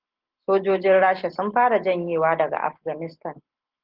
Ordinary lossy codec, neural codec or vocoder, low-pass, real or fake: Opus, 16 kbps; none; 5.4 kHz; real